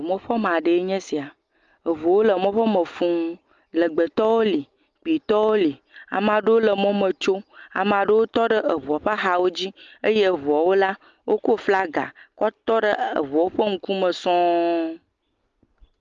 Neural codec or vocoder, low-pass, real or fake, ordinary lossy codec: none; 7.2 kHz; real; Opus, 32 kbps